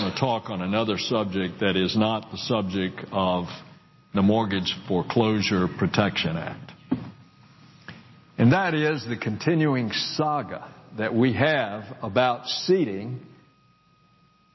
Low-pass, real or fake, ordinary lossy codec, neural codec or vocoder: 7.2 kHz; real; MP3, 24 kbps; none